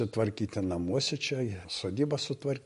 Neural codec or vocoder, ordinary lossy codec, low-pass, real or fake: none; MP3, 48 kbps; 14.4 kHz; real